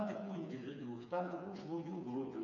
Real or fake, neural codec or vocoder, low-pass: fake; codec, 16 kHz, 4 kbps, FreqCodec, smaller model; 7.2 kHz